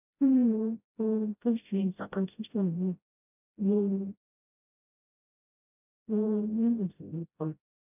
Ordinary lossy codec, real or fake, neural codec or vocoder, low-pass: none; fake; codec, 16 kHz, 0.5 kbps, FreqCodec, smaller model; 3.6 kHz